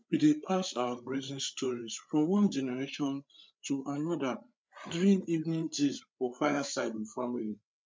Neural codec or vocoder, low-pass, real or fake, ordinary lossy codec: codec, 16 kHz, 8 kbps, FreqCodec, larger model; none; fake; none